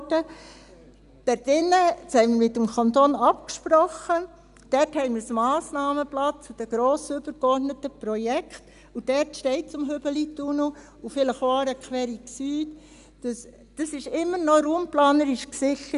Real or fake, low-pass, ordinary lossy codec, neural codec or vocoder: real; 10.8 kHz; none; none